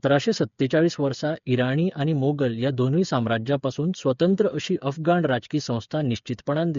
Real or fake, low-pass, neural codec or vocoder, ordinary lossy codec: fake; 7.2 kHz; codec, 16 kHz, 8 kbps, FreqCodec, smaller model; MP3, 48 kbps